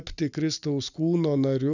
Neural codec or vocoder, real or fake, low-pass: none; real; 7.2 kHz